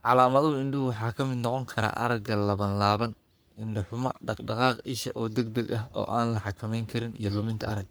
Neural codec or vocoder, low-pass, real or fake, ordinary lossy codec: codec, 44.1 kHz, 3.4 kbps, Pupu-Codec; none; fake; none